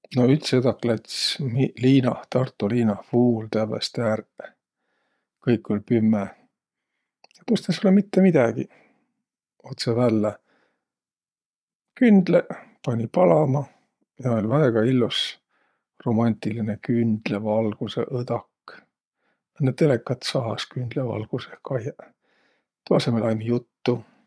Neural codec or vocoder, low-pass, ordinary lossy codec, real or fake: vocoder, 22.05 kHz, 80 mel bands, Vocos; none; none; fake